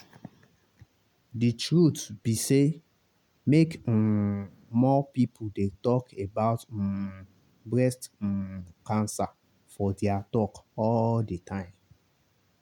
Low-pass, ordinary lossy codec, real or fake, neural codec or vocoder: 19.8 kHz; none; real; none